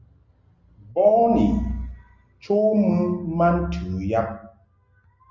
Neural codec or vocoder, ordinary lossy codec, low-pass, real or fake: none; Opus, 32 kbps; 7.2 kHz; real